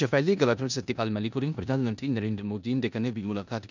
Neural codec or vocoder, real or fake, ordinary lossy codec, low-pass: codec, 16 kHz in and 24 kHz out, 0.9 kbps, LongCat-Audio-Codec, four codebook decoder; fake; none; 7.2 kHz